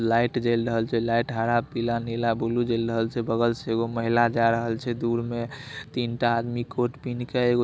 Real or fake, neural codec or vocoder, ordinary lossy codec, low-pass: real; none; none; none